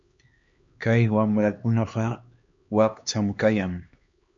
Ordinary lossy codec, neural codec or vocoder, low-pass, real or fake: MP3, 48 kbps; codec, 16 kHz, 2 kbps, X-Codec, HuBERT features, trained on LibriSpeech; 7.2 kHz; fake